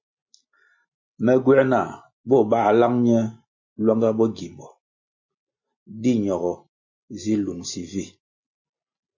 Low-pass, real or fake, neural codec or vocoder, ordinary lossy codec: 7.2 kHz; real; none; MP3, 32 kbps